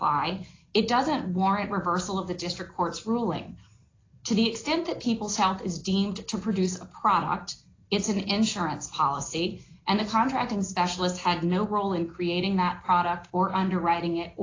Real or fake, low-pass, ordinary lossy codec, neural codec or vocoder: real; 7.2 kHz; AAC, 32 kbps; none